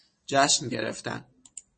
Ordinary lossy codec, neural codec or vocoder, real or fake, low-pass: MP3, 32 kbps; none; real; 9.9 kHz